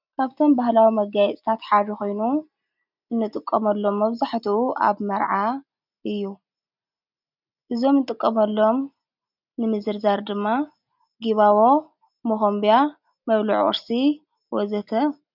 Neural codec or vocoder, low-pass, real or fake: none; 5.4 kHz; real